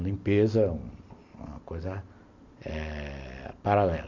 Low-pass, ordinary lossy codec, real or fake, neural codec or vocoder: 7.2 kHz; MP3, 48 kbps; real; none